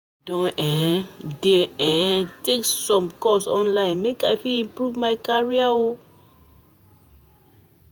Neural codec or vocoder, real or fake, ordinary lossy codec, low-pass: vocoder, 48 kHz, 128 mel bands, Vocos; fake; none; none